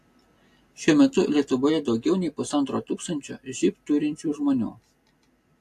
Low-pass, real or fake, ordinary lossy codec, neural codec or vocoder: 14.4 kHz; real; AAC, 64 kbps; none